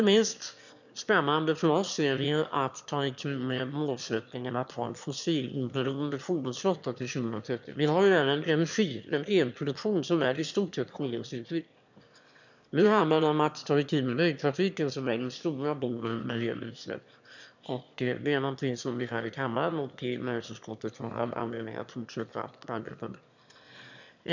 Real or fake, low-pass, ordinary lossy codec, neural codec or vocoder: fake; 7.2 kHz; none; autoencoder, 22.05 kHz, a latent of 192 numbers a frame, VITS, trained on one speaker